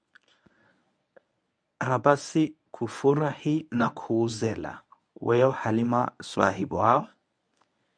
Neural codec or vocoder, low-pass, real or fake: codec, 24 kHz, 0.9 kbps, WavTokenizer, medium speech release version 1; 9.9 kHz; fake